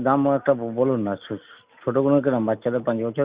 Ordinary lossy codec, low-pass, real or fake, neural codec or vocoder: Opus, 64 kbps; 3.6 kHz; real; none